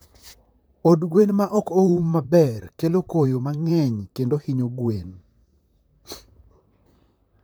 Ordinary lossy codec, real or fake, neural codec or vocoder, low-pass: none; fake; vocoder, 44.1 kHz, 128 mel bands, Pupu-Vocoder; none